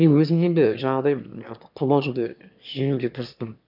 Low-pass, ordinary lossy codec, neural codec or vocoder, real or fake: 5.4 kHz; none; autoencoder, 22.05 kHz, a latent of 192 numbers a frame, VITS, trained on one speaker; fake